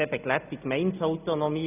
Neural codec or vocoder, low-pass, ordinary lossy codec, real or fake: none; 3.6 kHz; none; real